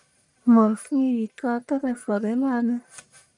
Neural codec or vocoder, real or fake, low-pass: codec, 44.1 kHz, 1.7 kbps, Pupu-Codec; fake; 10.8 kHz